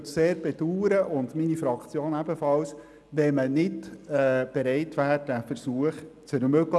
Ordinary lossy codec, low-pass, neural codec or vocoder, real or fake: none; none; none; real